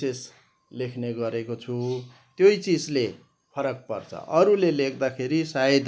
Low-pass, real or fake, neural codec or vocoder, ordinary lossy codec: none; real; none; none